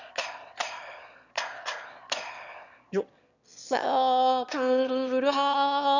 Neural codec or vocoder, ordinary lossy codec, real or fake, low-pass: autoencoder, 22.05 kHz, a latent of 192 numbers a frame, VITS, trained on one speaker; none; fake; 7.2 kHz